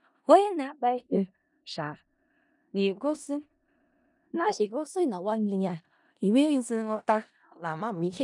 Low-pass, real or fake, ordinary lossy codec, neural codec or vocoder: 10.8 kHz; fake; none; codec, 16 kHz in and 24 kHz out, 0.4 kbps, LongCat-Audio-Codec, four codebook decoder